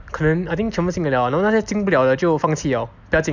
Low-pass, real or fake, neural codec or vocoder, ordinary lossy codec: 7.2 kHz; real; none; none